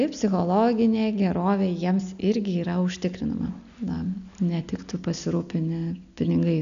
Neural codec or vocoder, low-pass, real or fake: none; 7.2 kHz; real